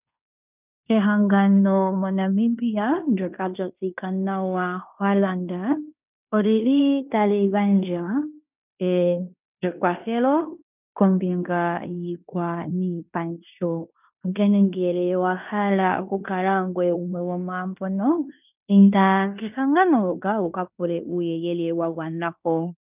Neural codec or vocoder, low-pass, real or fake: codec, 16 kHz in and 24 kHz out, 0.9 kbps, LongCat-Audio-Codec, fine tuned four codebook decoder; 3.6 kHz; fake